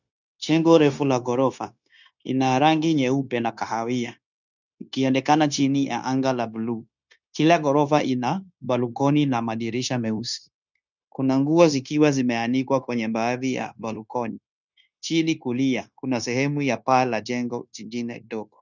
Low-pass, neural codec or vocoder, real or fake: 7.2 kHz; codec, 16 kHz, 0.9 kbps, LongCat-Audio-Codec; fake